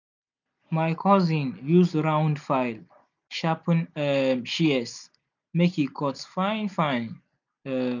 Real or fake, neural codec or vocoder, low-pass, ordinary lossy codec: real; none; 7.2 kHz; none